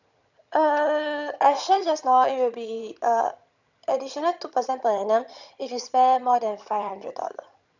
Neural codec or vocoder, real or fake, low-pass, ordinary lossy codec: vocoder, 22.05 kHz, 80 mel bands, HiFi-GAN; fake; 7.2 kHz; none